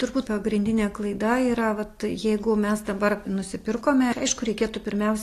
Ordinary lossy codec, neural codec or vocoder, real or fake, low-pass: AAC, 48 kbps; none; real; 14.4 kHz